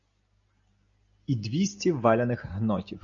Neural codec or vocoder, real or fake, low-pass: none; real; 7.2 kHz